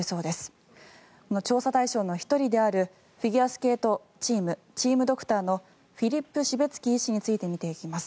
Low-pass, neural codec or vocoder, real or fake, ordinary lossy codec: none; none; real; none